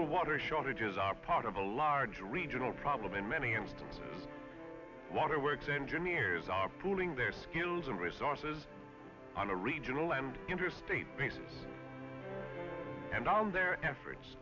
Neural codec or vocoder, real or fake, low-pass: none; real; 7.2 kHz